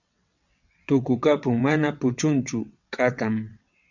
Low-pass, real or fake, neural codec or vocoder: 7.2 kHz; fake; vocoder, 22.05 kHz, 80 mel bands, WaveNeXt